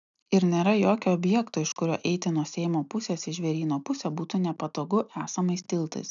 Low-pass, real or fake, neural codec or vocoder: 7.2 kHz; real; none